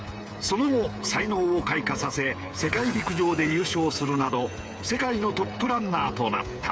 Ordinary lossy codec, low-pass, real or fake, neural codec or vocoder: none; none; fake; codec, 16 kHz, 8 kbps, FreqCodec, smaller model